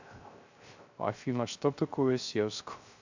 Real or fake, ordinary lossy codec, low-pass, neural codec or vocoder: fake; AAC, 48 kbps; 7.2 kHz; codec, 16 kHz, 0.3 kbps, FocalCodec